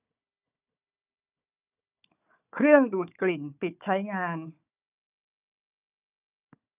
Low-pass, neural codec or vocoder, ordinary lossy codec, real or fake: 3.6 kHz; codec, 16 kHz, 4 kbps, FunCodec, trained on Chinese and English, 50 frames a second; none; fake